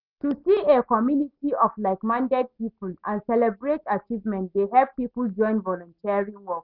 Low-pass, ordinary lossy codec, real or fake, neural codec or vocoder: 5.4 kHz; none; real; none